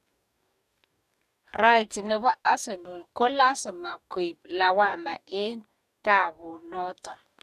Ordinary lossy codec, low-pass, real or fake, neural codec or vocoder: none; 14.4 kHz; fake; codec, 44.1 kHz, 2.6 kbps, DAC